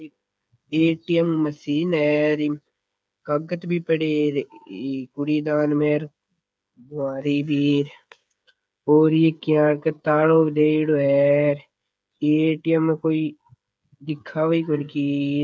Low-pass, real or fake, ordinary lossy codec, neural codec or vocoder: none; fake; none; codec, 16 kHz, 8 kbps, FreqCodec, smaller model